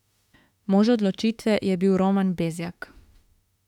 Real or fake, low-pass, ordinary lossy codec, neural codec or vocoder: fake; 19.8 kHz; none; autoencoder, 48 kHz, 32 numbers a frame, DAC-VAE, trained on Japanese speech